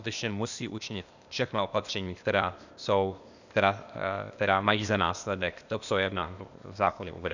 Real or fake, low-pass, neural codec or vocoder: fake; 7.2 kHz; codec, 16 kHz, 0.8 kbps, ZipCodec